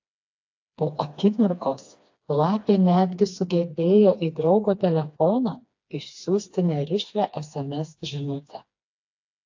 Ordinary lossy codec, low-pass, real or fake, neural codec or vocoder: AAC, 48 kbps; 7.2 kHz; fake; codec, 16 kHz, 2 kbps, FreqCodec, smaller model